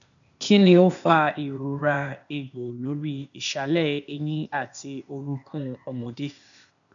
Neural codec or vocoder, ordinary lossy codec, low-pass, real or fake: codec, 16 kHz, 0.8 kbps, ZipCodec; none; 7.2 kHz; fake